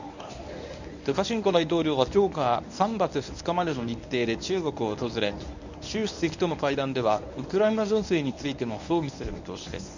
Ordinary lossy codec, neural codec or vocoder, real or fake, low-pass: none; codec, 24 kHz, 0.9 kbps, WavTokenizer, medium speech release version 1; fake; 7.2 kHz